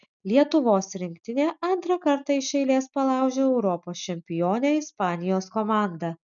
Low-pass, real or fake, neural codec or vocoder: 7.2 kHz; real; none